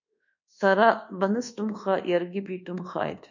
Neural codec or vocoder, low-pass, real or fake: codec, 24 kHz, 1.2 kbps, DualCodec; 7.2 kHz; fake